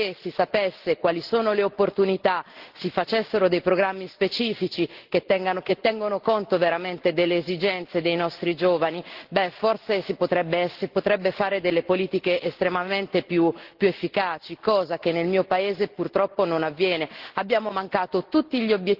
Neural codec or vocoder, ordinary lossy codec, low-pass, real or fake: none; Opus, 16 kbps; 5.4 kHz; real